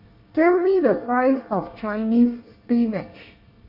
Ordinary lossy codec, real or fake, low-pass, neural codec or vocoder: MP3, 32 kbps; fake; 5.4 kHz; codec, 24 kHz, 1 kbps, SNAC